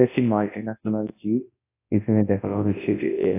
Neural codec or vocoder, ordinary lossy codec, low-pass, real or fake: codec, 24 kHz, 0.9 kbps, WavTokenizer, large speech release; AAC, 24 kbps; 3.6 kHz; fake